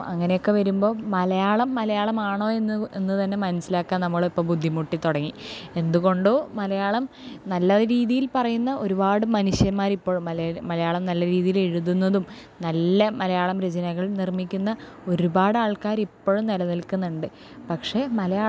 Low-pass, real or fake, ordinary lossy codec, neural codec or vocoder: none; real; none; none